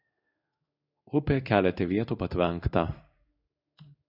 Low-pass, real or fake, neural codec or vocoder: 5.4 kHz; fake; codec, 16 kHz in and 24 kHz out, 1 kbps, XY-Tokenizer